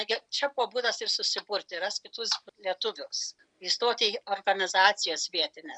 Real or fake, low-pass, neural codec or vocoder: real; 9.9 kHz; none